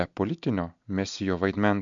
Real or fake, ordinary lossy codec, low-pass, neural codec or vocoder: real; MP3, 64 kbps; 7.2 kHz; none